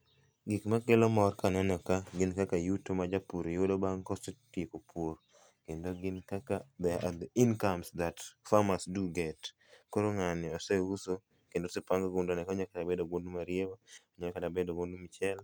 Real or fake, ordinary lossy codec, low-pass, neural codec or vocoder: real; none; none; none